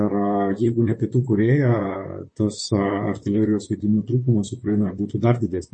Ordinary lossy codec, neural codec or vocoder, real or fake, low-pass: MP3, 32 kbps; vocoder, 44.1 kHz, 128 mel bands, Pupu-Vocoder; fake; 10.8 kHz